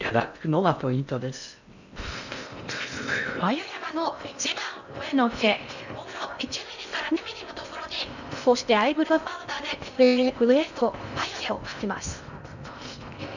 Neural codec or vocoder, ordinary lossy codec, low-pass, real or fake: codec, 16 kHz in and 24 kHz out, 0.6 kbps, FocalCodec, streaming, 4096 codes; none; 7.2 kHz; fake